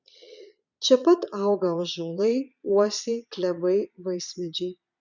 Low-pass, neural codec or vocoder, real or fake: 7.2 kHz; vocoder, 22.05 kHz, 80 mel bands, Vocos; fake